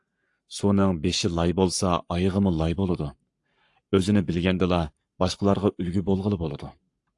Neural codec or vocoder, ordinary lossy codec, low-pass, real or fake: codec, 44.1 kHz, 7.8 kbps, Pupu-Codec; AAC, 64 kbps; 10.8 kHz; fake